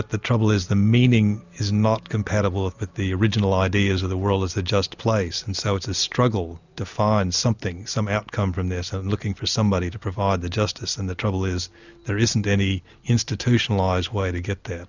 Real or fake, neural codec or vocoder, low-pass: real; none; 7.2 kHz